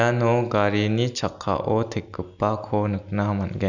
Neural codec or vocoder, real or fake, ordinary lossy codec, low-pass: none; real; none; 7.2 kHz